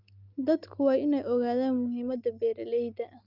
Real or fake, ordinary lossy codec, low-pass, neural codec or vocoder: real; Opus, 32 kbps; 5.4 kHz; none